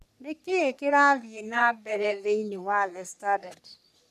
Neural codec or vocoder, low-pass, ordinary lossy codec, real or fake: codec, 44.1 kHz, 3.4 kbps, Pupu-Codec; 14.4 kHz; none; fake